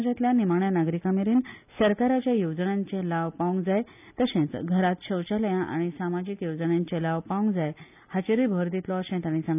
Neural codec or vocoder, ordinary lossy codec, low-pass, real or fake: none; none; 3.6 kHz; real